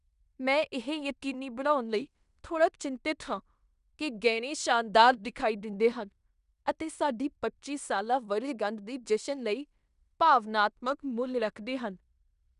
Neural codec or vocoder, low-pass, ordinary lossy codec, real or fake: codec, 16 kHz in and 24 kHz out, 0.9 kbps, LongCat-Audio-Codec, fine tuned four codebook decoder; 10.8 kHz; none; fake